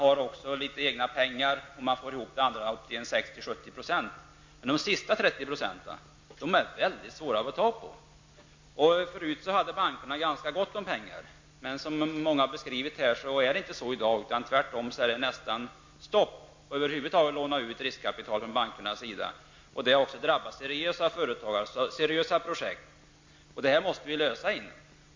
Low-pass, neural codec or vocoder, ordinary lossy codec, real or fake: 7.2 kHz; none; MP3, 48 kbps; real